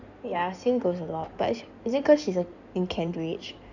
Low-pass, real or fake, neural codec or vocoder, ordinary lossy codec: 7.2 kHz; fake; codec, 16 kHz in and 24 kHz out, 2.2 kbps, FireRedTTS-2 codec; none